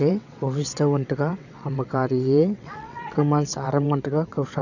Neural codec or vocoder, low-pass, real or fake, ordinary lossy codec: vocoder, 22.05 kHz, 80 mel bands, WaveNeXt; 7.2 kHz; fake; none